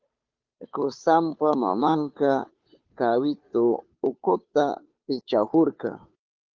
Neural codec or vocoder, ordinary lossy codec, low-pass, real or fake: codec, 16 kHz, 2 kbps, FunCodec, trained on Chinese and English, 25 frames a second; Opus, 32 kbps; 7.2 kHz; fake